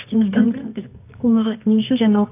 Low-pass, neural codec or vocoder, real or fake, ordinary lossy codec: 3.6 kHz; codec, 24 kHz, 0.9 kbps, WavTokenizer, medium music audio release; fake; AAC, 32 kbps